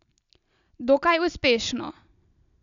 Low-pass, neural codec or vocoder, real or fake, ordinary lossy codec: 7.2 kHz; none; real; none